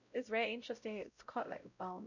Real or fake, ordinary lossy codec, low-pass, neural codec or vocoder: fake; none; 7.2 kHz; codec, 16 kHz, 0.5 kbps, X-Codec, WavLM features, trained on Multilingual LibriSpeech